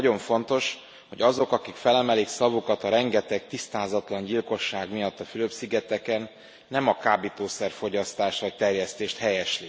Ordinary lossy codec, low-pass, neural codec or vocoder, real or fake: none; none; none; real